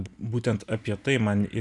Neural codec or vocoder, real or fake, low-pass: none; real; 10.8 kHz